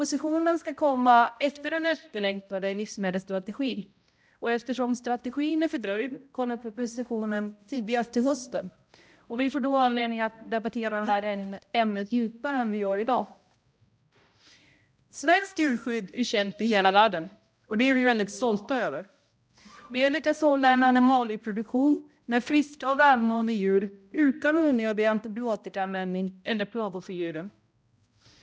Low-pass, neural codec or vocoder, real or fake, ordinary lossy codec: none; codec, 16 kHz, 0.5 kbps, X-Codec, HuBERT features, trained on balanced general audio; fake; none